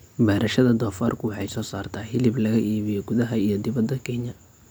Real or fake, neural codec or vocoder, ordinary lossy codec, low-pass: real; none; none; none